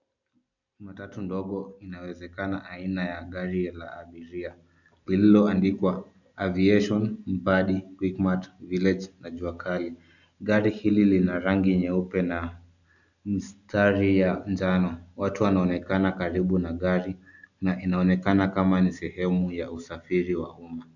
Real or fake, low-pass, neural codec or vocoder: real; 7.2 kHz; none